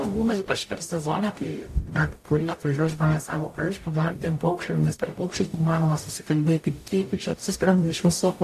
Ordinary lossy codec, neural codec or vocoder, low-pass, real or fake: AAC, 64 kbps; codec, 44.1 kHz, 0.9 kbps, DAC; 14.4 kHz; fake